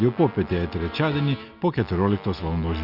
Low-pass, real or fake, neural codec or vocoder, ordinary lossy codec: 5.4 kHz; real; none; Opus, 64 kbps